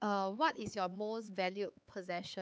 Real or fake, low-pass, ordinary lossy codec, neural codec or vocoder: fake; none; none; codec, 16 kHz, 4 kbps, X-Codec, HuBERT features, trained on LibriSpeech